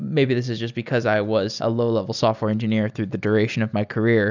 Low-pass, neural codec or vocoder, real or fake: 7.2 kHz; none; real